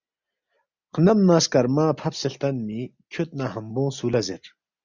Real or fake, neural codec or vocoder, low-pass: real; none; 7.2 kHz